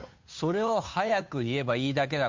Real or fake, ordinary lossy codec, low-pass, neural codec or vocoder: fake; MP3, 64 kbps; 7.2 kHz; codec, 16 kHz, 2 kbps, FunCodec, trained on Chinese and English, 25 frames a second